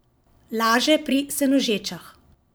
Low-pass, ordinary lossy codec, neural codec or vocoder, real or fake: none; none; vocoder, 44.1 kHz, 128 mel bands every 512 samples, BigVGAN v2; fake